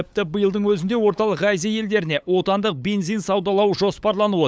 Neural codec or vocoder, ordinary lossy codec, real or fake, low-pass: codec, 16 kHz, 8 kbps, FunCodec, trained on LibriTTS, 25 frames a second; none; fake; none